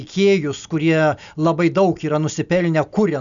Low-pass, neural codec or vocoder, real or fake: 7.2 kHz; none; real